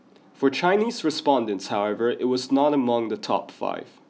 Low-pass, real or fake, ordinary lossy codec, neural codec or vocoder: none; real; none; none